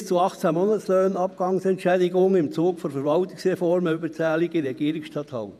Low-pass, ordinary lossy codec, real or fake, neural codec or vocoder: 14.4 kHz; none; fake; vocoder, 48 kHz, 128 mel bands, Vocos